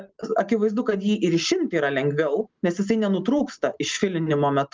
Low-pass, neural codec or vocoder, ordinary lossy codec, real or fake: 7.2 kHz; none; Opus, 32 kbps; real